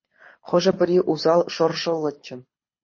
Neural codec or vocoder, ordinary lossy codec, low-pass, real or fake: codec, 24 kHz, 6 kbps, HILCodec; MP3, 32 kbps; 7.2 kHz; fake